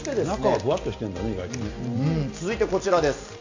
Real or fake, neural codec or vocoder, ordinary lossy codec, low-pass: real; none; AAC, 48 kbps; 7.2 kHz